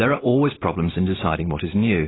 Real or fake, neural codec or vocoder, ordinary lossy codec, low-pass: real; none; AAC, 16 kbps; 7.2 kHz